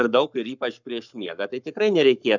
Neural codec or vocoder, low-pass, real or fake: codec, 44.1 kHz, 7.8 kbps, Pupu-Codec; 7.2 kHz; fake